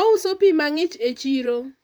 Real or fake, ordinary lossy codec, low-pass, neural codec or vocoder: fake; none; none; vocoder, 44.1 kHz, 128 mel bands, Pupu-Vocoder